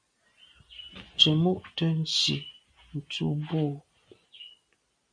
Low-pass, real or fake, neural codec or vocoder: 9.9 kHz; real; none